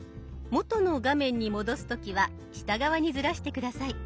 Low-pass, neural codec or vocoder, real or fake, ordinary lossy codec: none; none; real; none